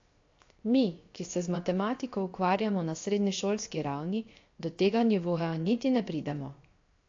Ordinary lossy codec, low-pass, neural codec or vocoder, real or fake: AAC, 48 kbps; 7.2 kHz; codec, 16 kHz, 0.7 kbps, FocalCodec; fake